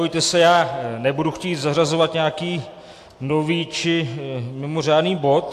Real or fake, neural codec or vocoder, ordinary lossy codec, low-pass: real; none; AAC, 64 kbps; 14.4 kHz